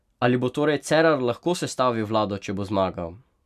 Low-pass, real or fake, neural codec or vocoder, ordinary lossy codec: 14.4 kHz; real; none; none